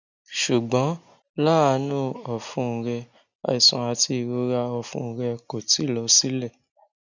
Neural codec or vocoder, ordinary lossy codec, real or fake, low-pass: none; none; real; 7.2 kHz